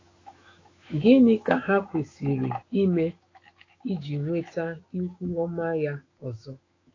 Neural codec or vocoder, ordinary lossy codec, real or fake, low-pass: autoencoder, 48 kHz, 128 numbers a frame, DAC-VAE, trained on Japanese speech; AAC, 32 kbps; fake; 7.2 kHz